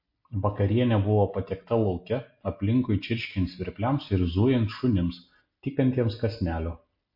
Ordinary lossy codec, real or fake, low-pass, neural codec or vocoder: MP3, 32 kbps; real; 5.4 kHz; none